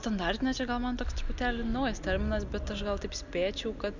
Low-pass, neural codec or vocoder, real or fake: 7.2 kHz; none; real